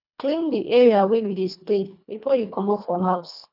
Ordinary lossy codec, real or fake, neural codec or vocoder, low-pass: none; fake; codec, 24 kHz, 1.5 kbps, HILCodec; 5.4 kHz